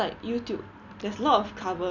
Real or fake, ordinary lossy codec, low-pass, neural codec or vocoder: real; none; 7.2 kHz; none